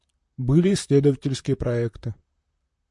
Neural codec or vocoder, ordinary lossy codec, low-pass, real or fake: vocoder, 44.1 kHz, 128 mel bands, Pupu-Vocoder; MP3, 64 kbps; 10.8 kHz; fake